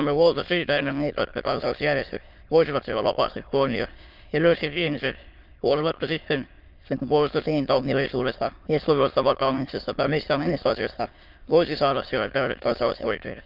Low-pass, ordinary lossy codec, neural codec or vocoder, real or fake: 5.4 kHz; Opus, 24 kbps; autoencoder, 22.05 kHz, a latent of 192 numbers a frame, VITS, trained on many speakers; fake